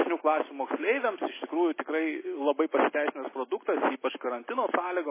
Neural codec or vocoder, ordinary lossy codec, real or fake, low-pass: none; MP3, 16 kbps; real; 3.6 kHz